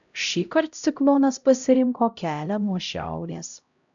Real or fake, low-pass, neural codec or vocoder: fake; 7.2 kHz; codec, 16 kHz, 0.5 kbps, X-Codec, HuBERT features, trained on LibriSpeech